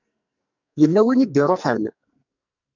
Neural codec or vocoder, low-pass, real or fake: codec, 44.1 kHz, 2.6 kbps, SNAC; 7.2 kHz; fake